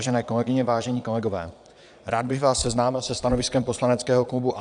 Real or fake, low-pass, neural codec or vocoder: fake; 9.9 kHz; vocoder, 22.05 kHz, 80 mel bands, Vocos